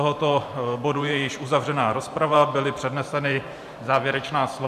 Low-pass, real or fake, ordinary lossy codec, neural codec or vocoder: 14.4 kHz; fake; MP3, 96 kbps; vocoder, 44.1 kHz, 128 mel bands every 512 samples, BigVGAN v2